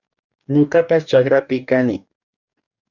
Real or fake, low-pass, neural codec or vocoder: fake; 7.2 kHz; codec, 44.1 kHz, 2.6 kbps, DAC